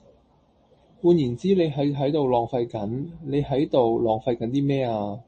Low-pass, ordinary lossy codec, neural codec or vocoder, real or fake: 10.8 kHz; MP3, 32 kbps; none; real